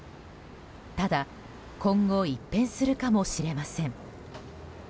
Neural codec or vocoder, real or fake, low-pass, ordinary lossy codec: none; real; none; none